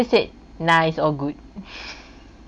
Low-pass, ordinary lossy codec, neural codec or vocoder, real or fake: 9.9 kHz; none; none; real